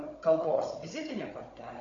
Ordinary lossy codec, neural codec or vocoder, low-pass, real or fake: AAC, 32 kbps; codec, 16 kHz, 16 kbps, FunCodec, trained on Chinese and English, 50 frames a second; 7.2 kHz; fake